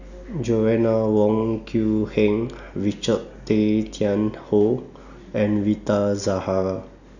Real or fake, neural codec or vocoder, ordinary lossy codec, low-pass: real; none; none; 7.2 kHz